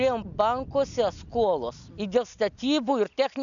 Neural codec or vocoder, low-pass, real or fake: none; 7.2 kHz; real